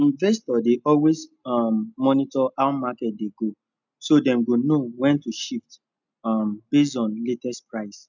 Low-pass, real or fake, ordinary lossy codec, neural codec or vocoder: 7.2 kHz; real; none; none